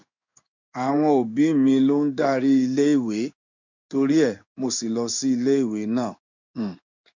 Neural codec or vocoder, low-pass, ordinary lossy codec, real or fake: codec, 16 kHz in and 24 kHz out, 1 kbps, XY-Tokenizer; 7.2 kHz; none; fake